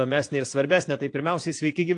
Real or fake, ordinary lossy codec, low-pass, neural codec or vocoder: fake; AAC, 48 kbps; 9.9 kHz; vocoder, 22.05 kHz, 80 mel bands, Vocos